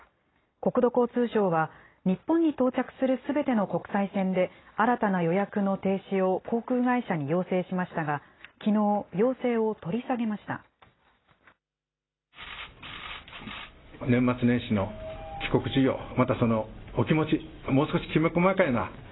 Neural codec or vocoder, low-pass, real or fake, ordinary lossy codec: none; 7.2 kHz; real; AAC, 16 kbps